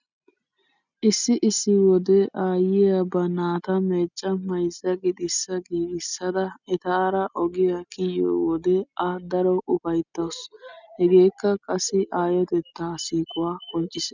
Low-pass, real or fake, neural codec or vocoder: 7.2 kHz; real; none